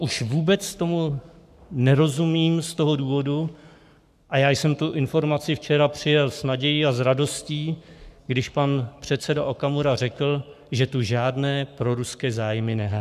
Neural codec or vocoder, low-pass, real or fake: codec, 44.1 kHz, 7.8 kbps, Pupu-Codec; 14.4 kHz; fake